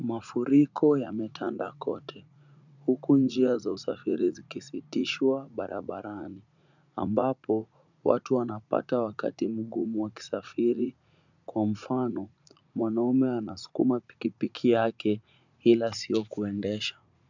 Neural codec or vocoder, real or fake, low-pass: vocoder, 44.1 kHz, 80 mel bands, Vocos; fake; 7.2 kHz